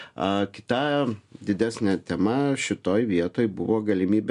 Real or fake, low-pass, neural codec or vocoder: real; 10.8 kHz; none